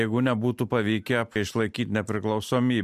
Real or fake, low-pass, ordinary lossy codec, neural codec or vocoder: real; 14.4 kHz; MP3, 96 kbps; none